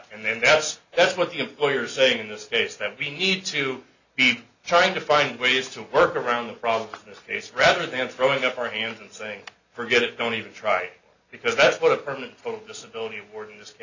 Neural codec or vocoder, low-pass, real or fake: none; 7.2 kHz; real